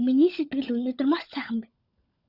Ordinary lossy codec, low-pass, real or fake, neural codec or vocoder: Opus, 64 kbps; 5.4 kHz; fake; codec, 16 kHz, 16 kbps, FunCodec, trained on LibriTTS, 50 frames a second